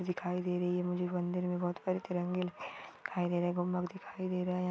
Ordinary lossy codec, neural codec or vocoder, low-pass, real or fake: none; none; none; real